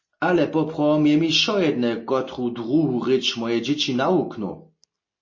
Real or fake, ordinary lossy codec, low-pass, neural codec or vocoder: real; MP3, 32 kbps; 7.2 kHz; none